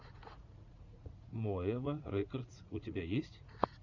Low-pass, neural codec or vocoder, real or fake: 7.2 kHz; vocoder, 44.1 kHz, 80 mel bands, Vocos; fake